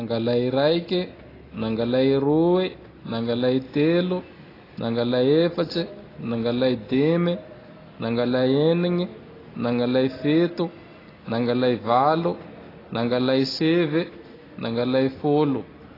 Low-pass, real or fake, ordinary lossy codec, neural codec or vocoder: 5.4 kHz; real; AAC, 24 kbps; none